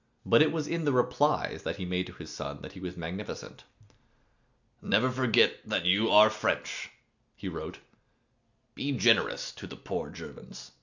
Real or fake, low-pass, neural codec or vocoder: real; 7.2 kHz; none